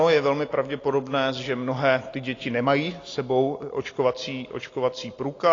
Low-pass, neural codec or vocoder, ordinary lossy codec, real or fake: 7.2 kHz; none; AAC, 32 kbps; real